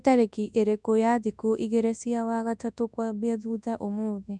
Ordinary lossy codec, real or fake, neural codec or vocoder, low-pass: none; fake; codec, 24 kHz, 0.9 kbps, WavTokenizer, large speech release; 10.8 kHz